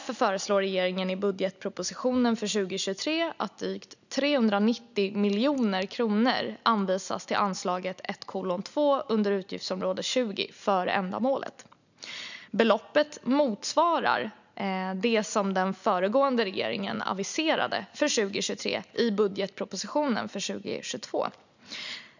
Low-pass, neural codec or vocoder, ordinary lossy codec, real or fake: 7.2 kHz; none; none; real